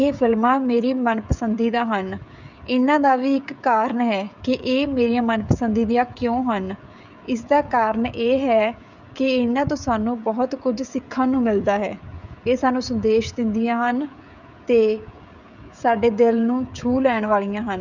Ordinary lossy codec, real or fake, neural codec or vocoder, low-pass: none; fake; codec, 16 kHz, 16 kbps, FreqCodec, smaller model; 7.2 kHz